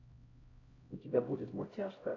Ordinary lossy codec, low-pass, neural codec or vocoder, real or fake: AAC, 32 kbps; 7.2 kHz; codec, 16 kHz, 0.5 kbps, X-Codec, HuBERT features, trained on LibriSpeech; fake